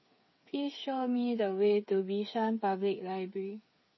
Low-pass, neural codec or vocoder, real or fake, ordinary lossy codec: 7.2 kHz; codec, 16 kHz, 8 kbps, FreqCodec, smaller model; fake; MP3, 24 kbps